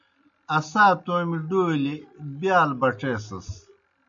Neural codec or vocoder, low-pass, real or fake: none; 7.2 kHz; real